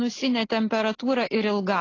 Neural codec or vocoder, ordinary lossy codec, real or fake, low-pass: none; AAC, 32 kbps; real; 7.2 kHz